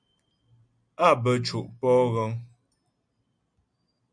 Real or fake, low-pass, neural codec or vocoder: real; 9.9 kHz; none